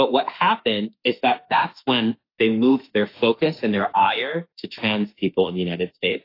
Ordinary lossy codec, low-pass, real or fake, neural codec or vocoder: AAC, 32 kbps; 5.4 kHz; fake; autoencoder, 48 kHz, 32 numbers a frame, DAC-VAE, trained on Japanese speech